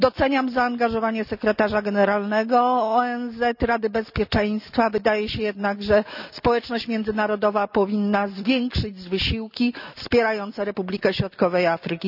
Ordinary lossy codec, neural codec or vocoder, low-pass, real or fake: none; none; 5.4 kHz; real